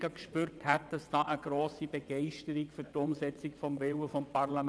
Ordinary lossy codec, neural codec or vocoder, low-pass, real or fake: none; vocoder, 22.05 kHz, 80 mel bands, WaveNeXt; none; fake